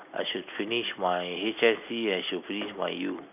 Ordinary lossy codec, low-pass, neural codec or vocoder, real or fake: none; 3.6 kHz; none; real